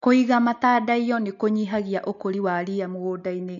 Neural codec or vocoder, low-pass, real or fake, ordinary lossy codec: none; 7.2 kHz; real; none